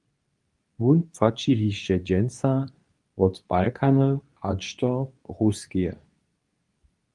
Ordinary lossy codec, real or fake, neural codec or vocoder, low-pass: Opus, 32 kbps; fake; codec, 24 kHz, 0.9 kbps, WavTokenizer, medium speech release version 2; 10.8 kHz